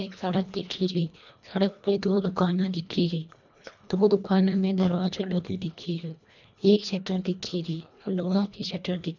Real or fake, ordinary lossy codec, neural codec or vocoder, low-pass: fake; none; codec, 24 kHz, 1.5 kbps, HILCodec; 7.2 kHz